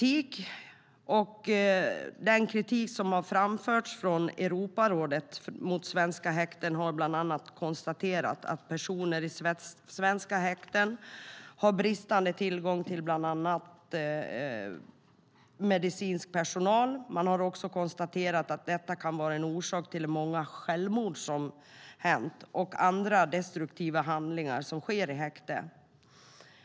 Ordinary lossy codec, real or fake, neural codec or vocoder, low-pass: none; real; none; none